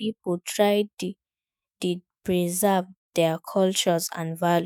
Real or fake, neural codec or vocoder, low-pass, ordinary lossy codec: fake; autoencoder, 48 kHz, 128 numbers a frame, DAC-VAE, trained on Japanese speech; none; none